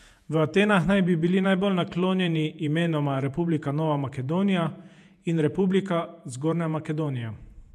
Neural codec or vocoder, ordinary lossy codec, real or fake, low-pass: vocoder, 48 kHz, 128 mel bands, Vocos; MP3, 96 kbps; fake; 14.4 kHz